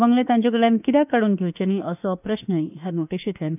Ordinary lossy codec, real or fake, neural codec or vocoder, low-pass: none; fake; autoencoder, 48 kHz, 32 numbers a frame, DAC-VAE, trained on Japanese speech; 3.6 kHz